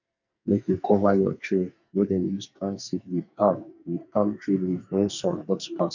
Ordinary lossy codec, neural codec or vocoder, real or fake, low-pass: none; codec, 44.1 kHz, 2.6 kbps, SNAC; fake; 7.2 kHz